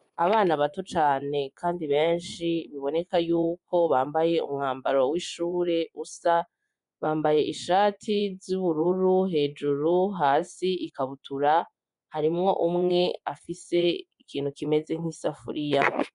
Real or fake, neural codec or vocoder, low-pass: fake; vocoder, 24 kHz, 100 mel bands, Vocos; 10.8 kHz